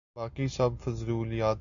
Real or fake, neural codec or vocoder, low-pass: real; none; 7.2 kHz